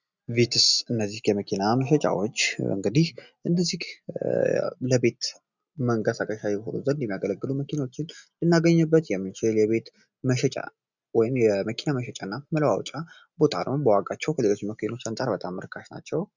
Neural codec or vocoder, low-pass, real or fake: none; 7.2 kHz; real